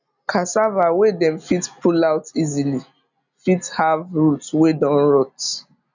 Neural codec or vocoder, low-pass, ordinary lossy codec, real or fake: none; 7.2 kHz; none; real